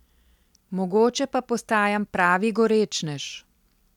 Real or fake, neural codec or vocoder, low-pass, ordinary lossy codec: real; none; 19.8 kHz; none